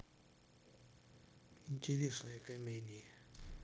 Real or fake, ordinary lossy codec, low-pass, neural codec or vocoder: fake; none; none; codec, 16 kHz, 0.9 kbps, LongCat-Audio-Codec